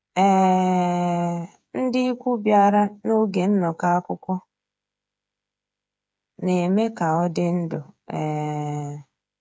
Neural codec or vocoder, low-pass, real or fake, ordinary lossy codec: codec, 16 kHz, 8 kbps, FreqCodec, smaller model; none; fake; none